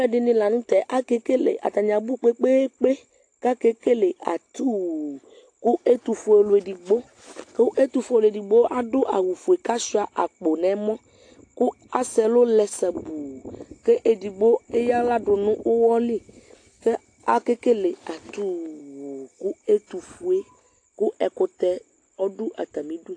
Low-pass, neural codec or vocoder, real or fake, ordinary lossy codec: 9.9 kHz; none; real; AAC, 48 kbps